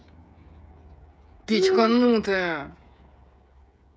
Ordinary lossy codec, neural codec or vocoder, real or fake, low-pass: none; codec, 16 kHz, 16 kbps, FreqCodec, smaller model; fake; none